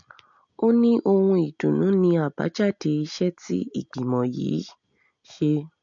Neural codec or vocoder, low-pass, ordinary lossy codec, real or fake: none; 7.2 kHz; MP3, 48 kbps; real